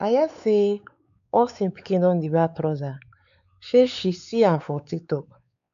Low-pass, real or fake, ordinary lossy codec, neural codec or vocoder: 7.2 kHz; fake; none; codec, 16 kHz, 4 kbps, X-Codec, WavLM features, trained on Multilingual LibriSpeech